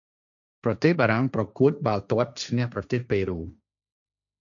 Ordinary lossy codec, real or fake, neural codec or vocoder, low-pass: MP3, 96 kbps; fake; codec, 16 kHz, 1.1 kbps, Voila-Tokenizer; 7.2 kHz